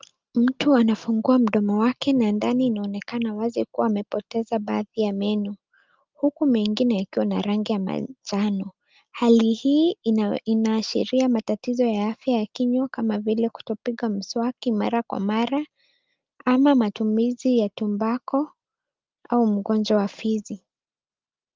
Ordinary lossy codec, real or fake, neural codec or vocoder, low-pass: Opus, 32 kbps; real; none; 7.2 kHz